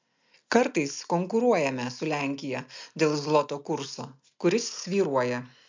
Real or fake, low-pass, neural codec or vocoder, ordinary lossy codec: real; 7.2 kHz; none; MP3, 64 kbps